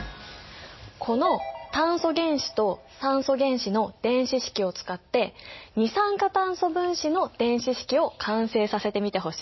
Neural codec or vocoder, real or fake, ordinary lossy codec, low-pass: none; real; MP3, 24 kbps; 7.2 kHz